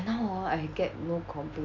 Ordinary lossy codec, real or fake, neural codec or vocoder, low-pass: none; real; none; 7.2 kHz